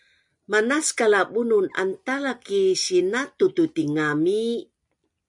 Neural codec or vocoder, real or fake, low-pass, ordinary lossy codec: none; real; 10.8 kHz; MP3, 96 kbps